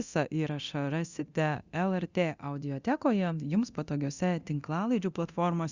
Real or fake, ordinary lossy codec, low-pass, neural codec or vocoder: fake; Opus, 64 kbps; 7.2 kHz; codec, 24 kHz, 0.9 kbps, DualCodec